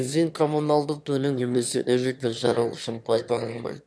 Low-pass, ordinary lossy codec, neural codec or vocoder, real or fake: none; none; autoencoder, 22.05 kHz, a latent of 192 numbers a frame, VITS, trained on one speaker; fake